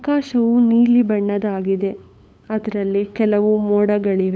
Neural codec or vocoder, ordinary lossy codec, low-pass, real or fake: codec, 16 kHz, 8 kbps, FunCodec, trained on LibriTTS, 25 frames a second; none; none; fake